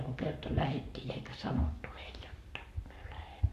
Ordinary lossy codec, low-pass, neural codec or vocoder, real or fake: AAC, 64 kbps; 14.4 kHz; codec, 44.1 kHz, 7.8 kbps, Pupu-Codec; fake